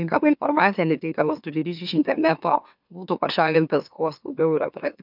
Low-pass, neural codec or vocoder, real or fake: 5.4 kHz; autoencoder, 44.1 kHz, a latent of 192 numbers a frame, MeloTTS; fake